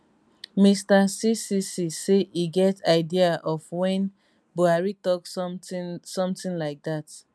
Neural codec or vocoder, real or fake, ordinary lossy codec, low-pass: none; real; none; none